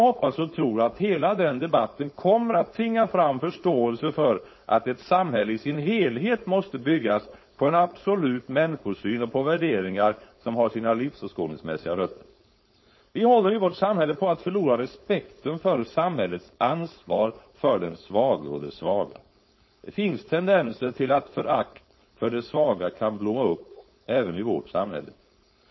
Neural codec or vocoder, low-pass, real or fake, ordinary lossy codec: codec, 16 kHz, 4.8 kbps, FACodec; 7.2 kHz; fake; MP3, 24 kbps